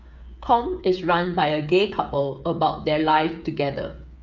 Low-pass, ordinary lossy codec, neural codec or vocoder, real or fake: 7.2 kHz; none; codec, 16 kHz, 8 kbps, FreqCodec, smaller model; fake